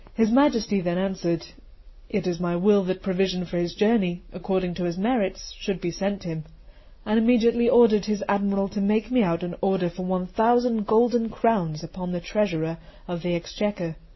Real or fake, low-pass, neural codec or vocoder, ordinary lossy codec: real; 7.2 kHz; none; MP3, 24 kbps